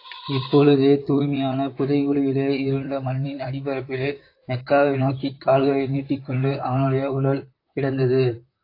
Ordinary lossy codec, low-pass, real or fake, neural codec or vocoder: AAC, 32 kbps; 5.4 kHz; fake; vocoder, 44.1 kHz, 128 mel bands, Pupu-Vocoder